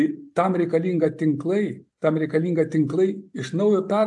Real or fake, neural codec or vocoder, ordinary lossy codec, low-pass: real; none; AAC, 64 kbps; 10.8 kHz